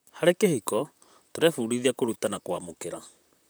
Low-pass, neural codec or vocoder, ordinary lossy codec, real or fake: none; vocoder, 44.1 kHz, 128 mel bands, Pupu-Vocoder; none; fake